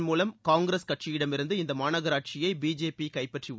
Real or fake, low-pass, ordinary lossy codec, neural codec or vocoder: real; none; none; none